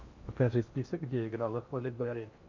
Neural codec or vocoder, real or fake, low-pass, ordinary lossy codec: codec, 16 kHz in and 24 kHz out, 0.8 kbps, FocalCodec, streaming, 65536 codes; fake; 7.2 kHz; Opus, 64 kbps